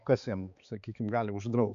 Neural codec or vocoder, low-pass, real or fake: codec, 16 kHz, 4 kbps, X-Codec, HuBERT features, trained on balanced general audio; 7.2 kHz; fake